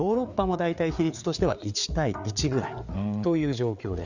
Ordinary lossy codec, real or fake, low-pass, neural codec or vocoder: none; fake; 7.2 kHz; codec, 16 kHz, 4 kbps, FreqCodec, larger model